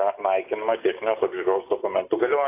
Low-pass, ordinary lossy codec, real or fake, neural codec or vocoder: 3.6 kHz; AAC, 24 kbps; real; none